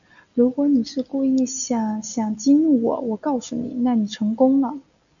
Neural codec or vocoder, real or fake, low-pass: none; real; 7.2 kHz